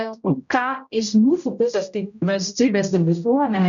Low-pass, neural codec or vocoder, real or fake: 7.2 kHz; codec, 16 kHz, 0.5 kbps, X-Codec, HuBERT features, trained on general audio; fake